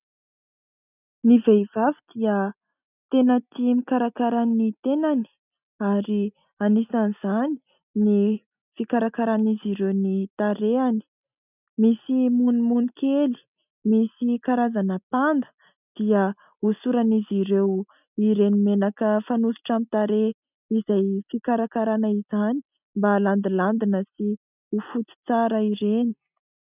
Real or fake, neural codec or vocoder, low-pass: real; none; 3.6 kHz